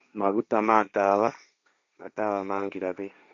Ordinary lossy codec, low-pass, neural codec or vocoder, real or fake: none; 7.2 kHz; codec, 16 kHz, 1.1 kbps, Voila-Tokenizer; fake